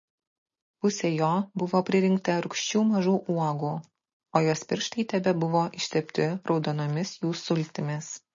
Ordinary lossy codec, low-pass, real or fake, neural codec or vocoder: MP3, 32 kbps; 7.2 kHz; real; none